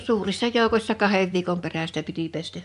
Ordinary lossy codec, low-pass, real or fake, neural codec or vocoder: AAC, 96 kbps; 10.8 kHz; fake; vocoder, 24 kHz, 100 mel bands, Vocos